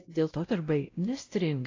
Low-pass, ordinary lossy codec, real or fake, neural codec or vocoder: 7.2 kHz; AAC, 32 kbps; fake; codec, 16 kHz, 0.5 kbps, X-Codec, WavLM features, trained on Multilingual LibriSpeech